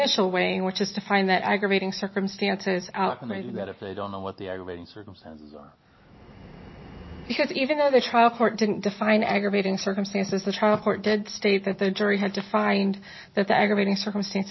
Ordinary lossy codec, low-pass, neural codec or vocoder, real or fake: MP3, 24 kbps; 7.2 kHz; none; real